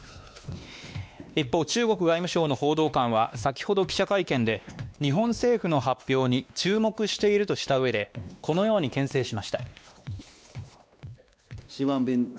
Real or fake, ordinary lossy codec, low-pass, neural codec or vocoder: fake; none; none; codec, 16 kHz, 2 kbps, X-Codec, WavLM features, trained on Multilingual LibriSpeech